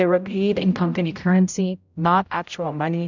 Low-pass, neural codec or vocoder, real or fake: 7.2 kHz; codec, 16 kHz, 0.5 kbps, X-Codec, HuBERT features, trained on general audio; fake